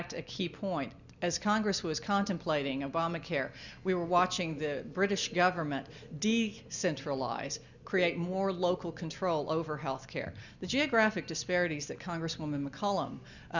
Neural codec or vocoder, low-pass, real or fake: none; 7.2 kHz; real